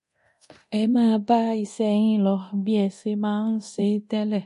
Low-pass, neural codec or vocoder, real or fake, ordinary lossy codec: 10.8 kHz; codec, 24 kHz, 0.9 kbps, DualCodec; fake; MP3, 48 kbps